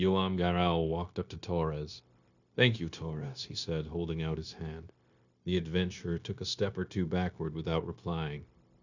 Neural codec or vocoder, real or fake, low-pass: codec, 16 kHz, 0.9 kbps, LongCat-Audio-Codec; fake; 7.2 kHz